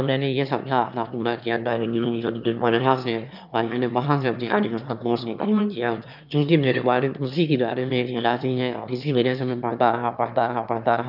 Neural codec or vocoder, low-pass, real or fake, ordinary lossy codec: autoencoder, 22.05 kHz, a latent of 192 numbers a frame, VITS, trained on one speaker; 5.4 kHz; fake; none